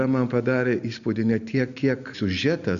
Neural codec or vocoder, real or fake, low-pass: none; real; 7.2 kHz